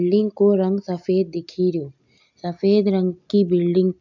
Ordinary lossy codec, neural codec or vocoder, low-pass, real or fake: none; none; 7.2 kHz; real